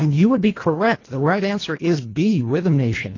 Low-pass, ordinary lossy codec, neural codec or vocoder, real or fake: 7.2 kHz; AAC, 32 kbps; codec, 24 kHz, 1.5 kbps, HILCodec; fake